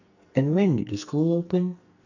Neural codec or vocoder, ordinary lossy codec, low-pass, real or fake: codec, 44.1 kHz, 2.6 kbps, SNAC; none; 7.2 kHz; fake